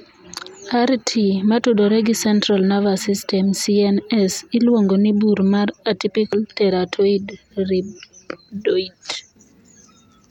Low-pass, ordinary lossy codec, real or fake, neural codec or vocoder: 19.8 kHz; none; real; none